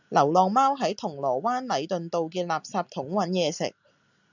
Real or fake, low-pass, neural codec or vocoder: real; 7.2 kHz; none